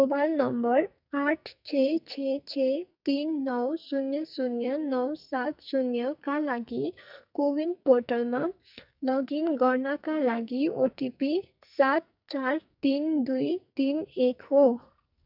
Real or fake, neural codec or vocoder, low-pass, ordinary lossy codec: fake; codec, 44.1 kHz, 2.6 kbps, SNAC; 5.4 kHz; none